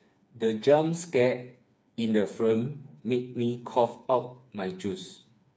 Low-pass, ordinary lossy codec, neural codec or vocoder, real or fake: none; none; codec, 16 kHz, 4 kbps, FreqCodec, smaller model; fake